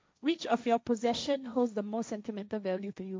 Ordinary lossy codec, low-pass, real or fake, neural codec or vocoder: none; none; fake; codec, 16 kHz, 1.1 kbps, Voila-Tokenizer